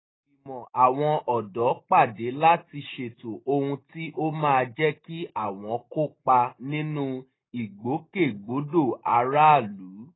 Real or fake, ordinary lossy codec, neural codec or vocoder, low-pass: real; AAC, 16 kbps; none; 7.2 kHz